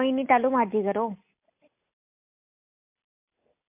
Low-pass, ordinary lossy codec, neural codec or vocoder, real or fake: 3.6 kHz; MP3, 32 kbps; none; real